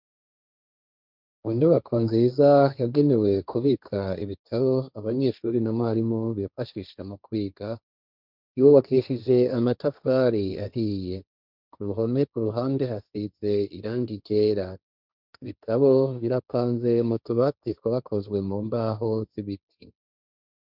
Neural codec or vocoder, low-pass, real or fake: codec, 16 kHz, 1.1 kbps, Voila-Tokenizer; 5.4 kHz; fake